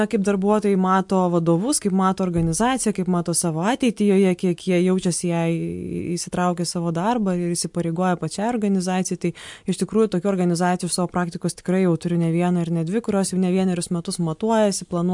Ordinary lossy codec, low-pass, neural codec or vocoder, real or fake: MP3, 64 kbps; 10.8 kHz; none; real